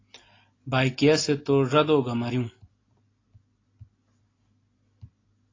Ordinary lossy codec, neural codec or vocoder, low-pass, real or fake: AAC, 32 kbps; none; 7.2 kHz; real